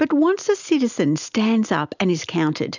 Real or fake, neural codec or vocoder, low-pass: real; none; 7.2 kHz